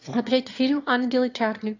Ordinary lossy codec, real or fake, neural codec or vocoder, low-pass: AAC, 48 kbps; fake; autoencoder, 22.05 kHz, a latent of 192 numbers a frame, VITS, trained on one speaker; 7.2 kHz